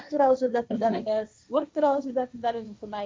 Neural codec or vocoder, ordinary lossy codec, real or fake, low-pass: codec, 16 kHz, 1.1 kbps, Voila-Tokenizer; none; fake; none